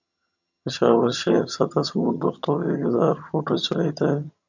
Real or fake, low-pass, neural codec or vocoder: fake; 7.2 kHz; vocoder, 22.05 kHz, 80 mel bands, HiFi-GAN